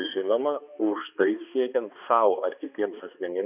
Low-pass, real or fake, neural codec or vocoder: 3.6 kHz; fake; codec, 16 kHz, 2 kbps, X-Codec, HuBERT features, trained on balanced general audio